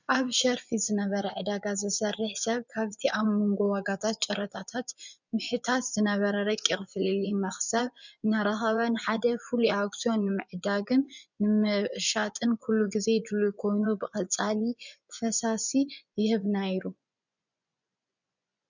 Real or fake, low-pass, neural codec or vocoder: fake; 7.2 kHz; vocoder, 24 kHz, 100 mel bands, Vocos